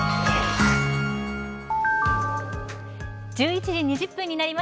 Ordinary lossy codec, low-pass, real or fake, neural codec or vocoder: none; none; real; none